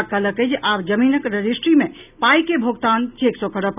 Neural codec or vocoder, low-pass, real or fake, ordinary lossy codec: none; 3.6 kHz; real; none